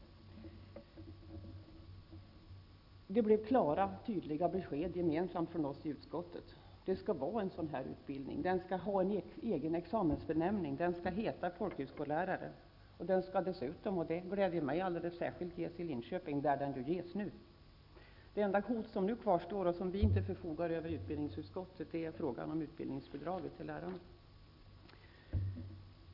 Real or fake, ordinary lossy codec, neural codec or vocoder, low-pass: real; none; none; 5.4 kHz